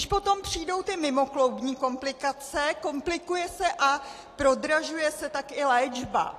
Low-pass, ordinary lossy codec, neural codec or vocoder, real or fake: 14.4 kHz; AAC, 48 kbps; none; real